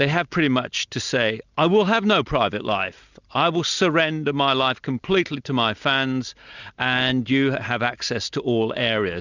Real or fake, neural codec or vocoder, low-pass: real; none; 7.2 kHz